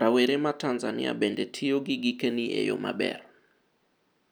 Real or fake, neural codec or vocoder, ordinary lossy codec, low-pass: real; none; none; none